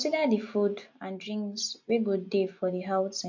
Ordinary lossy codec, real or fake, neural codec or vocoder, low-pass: MP3, 48 kbps; real; none; 7.2 kHz